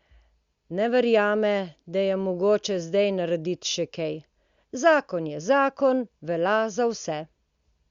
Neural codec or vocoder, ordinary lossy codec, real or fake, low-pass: none; Opus, 64 kbps; real; 7.2 kHz